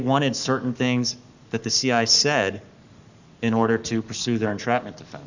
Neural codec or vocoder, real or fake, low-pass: codec, 44.1 kHz, 7.8 kbps, Pupu-Codec; fake; 7.2 kHz